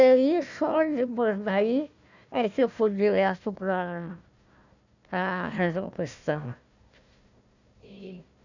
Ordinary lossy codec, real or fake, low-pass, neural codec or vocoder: none; fake; 7.2 kHz; codec, 16 kHz, 1 kbps, FunCodec, trained on Chinese and English, 50 frames a second